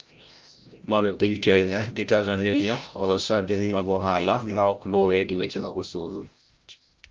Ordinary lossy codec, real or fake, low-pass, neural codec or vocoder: Opus, 24 kbps; fake; 7.2 kHz; codec, 16 kHz, 0.5 kbps, FreqCodec, larger model